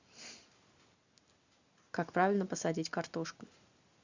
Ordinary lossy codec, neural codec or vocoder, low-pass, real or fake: Opus, 64 kbps; none; 7.2 kHz; real